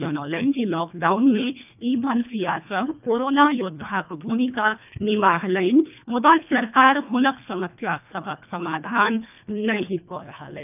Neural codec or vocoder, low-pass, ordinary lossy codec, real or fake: codec, 24 kHz, 1.5 kbps, HILCodec; 3.6 kHz; none; fake